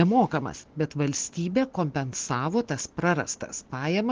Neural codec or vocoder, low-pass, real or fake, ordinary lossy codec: none; 7.2 kHz; real; Opus, 16 kbps